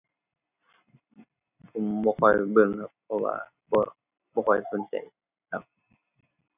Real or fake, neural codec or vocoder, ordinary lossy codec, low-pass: real; none; none; 3.6 kHz